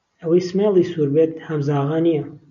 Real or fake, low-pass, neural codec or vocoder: real; 7.2 kHz; none